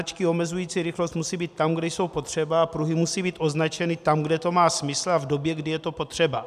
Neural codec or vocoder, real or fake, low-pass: none; real; 14.4 kHz